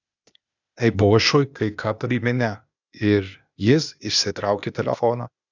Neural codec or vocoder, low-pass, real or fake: codec, 16 kHz, 0.8 kbps, ZipCodec; 7.2 kHz; fake